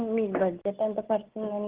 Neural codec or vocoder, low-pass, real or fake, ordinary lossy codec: none; 3.6 kHz; real; Opus, 16 kbps